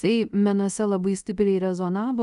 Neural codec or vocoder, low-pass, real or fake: codec, 24 kHz, 0.5 kbps, DualCodec; 10.8 kHz; fake